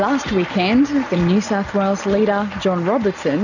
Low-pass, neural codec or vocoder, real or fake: 7.2 kHz; none; real